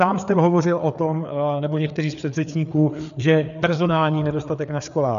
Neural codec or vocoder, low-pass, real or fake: codec, 16 kHz, 4 kbps, FreqCodec, larger model; 7.2 kHz; fake